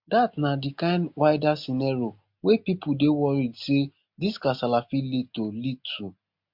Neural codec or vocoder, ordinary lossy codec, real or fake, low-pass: none; MP3, 48 kbps; real; 5.4 kHz